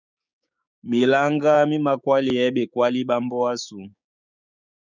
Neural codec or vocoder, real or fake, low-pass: codec, 16 kHz, 6 kbps, DAC; fake; 7.2 kHz